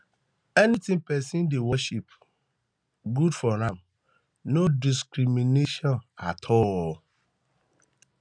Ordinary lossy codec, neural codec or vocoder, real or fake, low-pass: none; none; real; 9.9 kHz